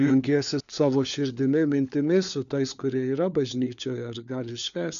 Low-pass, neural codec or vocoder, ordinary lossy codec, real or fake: 7.2 kHz; codec, 16 kHz, 4 kbps, FunCodec, trained on LibriTTS, 50 frames a second; MP3, 96 kbps; fake